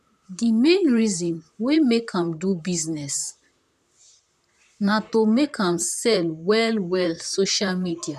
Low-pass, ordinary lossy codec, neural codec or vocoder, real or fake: 14.4 kHz; none; vocoder, 44.1 kHz, 128 mel bands, Pupu-Vocoder; fake